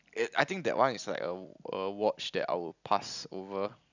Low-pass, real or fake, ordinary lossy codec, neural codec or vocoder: 7.2 kHz; real; none; none